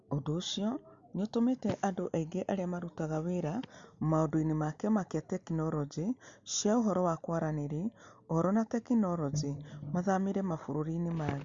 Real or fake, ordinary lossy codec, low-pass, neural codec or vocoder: real; Opus, 64 kbps; 7.2 kHz; none